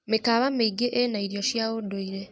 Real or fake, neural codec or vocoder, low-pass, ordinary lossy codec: real; none; none; none